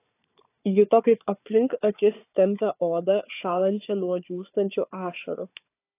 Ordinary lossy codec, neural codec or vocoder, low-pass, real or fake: AAC, 24 kbps; codec, 16 kHz, 4 kbps, FunCodec, trained on Chinese and English, 50 frames a second; 3.6 kHz; fake